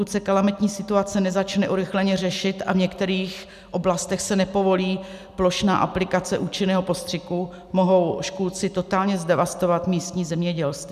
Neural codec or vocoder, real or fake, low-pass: none; real; 14.4 kHz